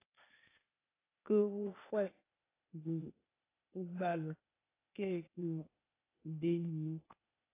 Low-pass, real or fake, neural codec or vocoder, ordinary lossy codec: 3.6 kHz; fake; codec, 16 kHz, 0.8 kbps, ZipCodec; AAC, 16 kbps